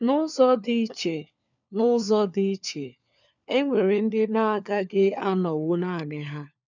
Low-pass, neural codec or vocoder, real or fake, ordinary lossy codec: 7.2 kHz; codec, 16 kHz, 4 kbps, FunCodec, trained on LibriTTS, 50 frames a second; fake; none